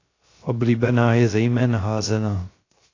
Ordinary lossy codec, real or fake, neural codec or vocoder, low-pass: AAC, 32 kbps; fake; codec, 16 kHz, 0.3 kbps, FocalCodec; 7.2 kHz